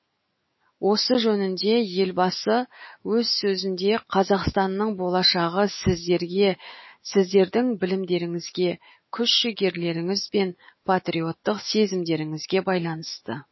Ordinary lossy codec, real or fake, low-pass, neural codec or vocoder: MP3, 24 kbps; fake; 7.2 kHz; autoencoder, 48 kHz, 128 numbers a frame, DAC-VAE, trained on Japanese speech